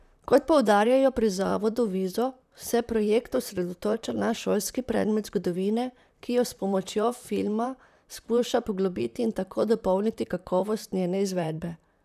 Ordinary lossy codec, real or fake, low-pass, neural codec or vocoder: none; fake; 14.4 kHz; vocoder, 44.1 kHz, 128 mel bands, Pupu-Vocoder